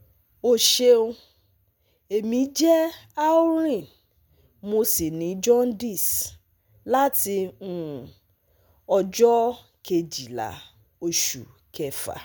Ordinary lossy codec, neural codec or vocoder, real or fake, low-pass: none; none; real; none